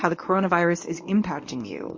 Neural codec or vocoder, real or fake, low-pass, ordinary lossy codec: codec, 24 kHz, 0.9 kbps, WavTokenizer, medium speech release version 1; fake; 7.2 kHz; MP3, 32 kbps